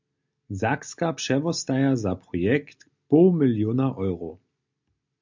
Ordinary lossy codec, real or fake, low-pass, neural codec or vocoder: MP3, 64 kbps; real; 7.2 kHz; none